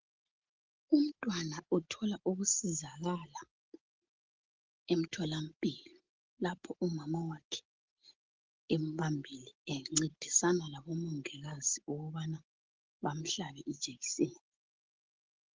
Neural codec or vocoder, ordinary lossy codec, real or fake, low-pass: none; Opus, 16 kbps; real; 7.2 kHz